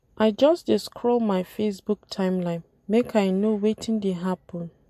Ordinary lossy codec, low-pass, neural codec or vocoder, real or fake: MP3, 64 kbps; 14.4 kHz; none; real